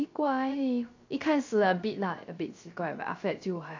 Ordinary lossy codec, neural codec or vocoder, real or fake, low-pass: AAC, 48 kbps; codec, 16 kHz, 0.3 kbps, FocalCodec; fake; 7.2 kHz